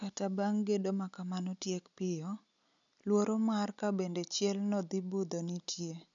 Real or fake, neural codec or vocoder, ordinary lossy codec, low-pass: real; none; none; 7.2 kHz